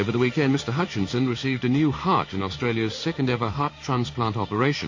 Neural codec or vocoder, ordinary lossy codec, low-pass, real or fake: codec, 16 kHz in and 24 kHz out, 1 kbps, XY-Tokenizer; MP3, 32 kbps; 7.2 kHz; fake